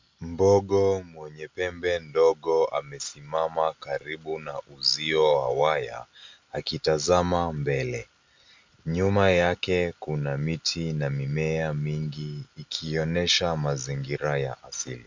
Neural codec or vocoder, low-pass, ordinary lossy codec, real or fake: none; 7.2 kHz; MP3, 64 kbps; real